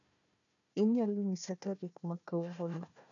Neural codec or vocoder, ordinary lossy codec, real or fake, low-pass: codec, 16 kHz, 1 kbps, FunCodec, trained on Chinese and English, 50 frames a second; none; fake; 7.2 kHz